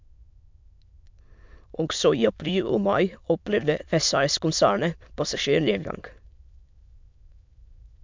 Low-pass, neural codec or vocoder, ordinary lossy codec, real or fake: 7.2 kHz; autoencoder, 22.05 kHz, a latent of 192 numbers a frame, VITS, trained on many speakers; MP3, 64 kbps; fake